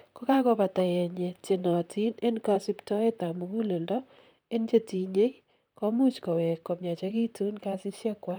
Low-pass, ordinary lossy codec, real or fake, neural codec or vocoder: none; none; fake; vocoder, 44.1 kHz, 128 mel bands, Pupu-Vocoder